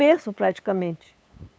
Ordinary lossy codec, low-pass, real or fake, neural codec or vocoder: none; none; fake; codec, 16 kHz, 8 kbps, FunCodec, trained on LibriTTS, 25 frames a second